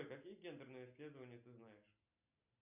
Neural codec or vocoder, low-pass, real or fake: none; 3.6 kHz; real